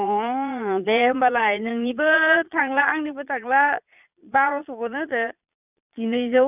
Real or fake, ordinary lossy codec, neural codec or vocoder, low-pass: fake; none; vocoder, 22.05 kHz, 80 mel bands, Vocos; 3.6 kHz